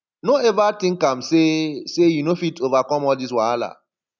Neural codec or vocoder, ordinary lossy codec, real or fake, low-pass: none; none; real; 7.2 kHz